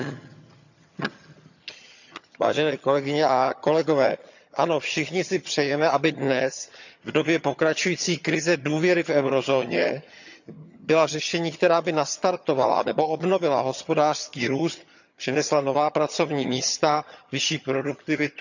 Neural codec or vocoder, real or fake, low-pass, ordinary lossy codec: vocoder, 22.05 kHz, 80 mel bands, HiFi-GAN; fake; 7.2 kHz; none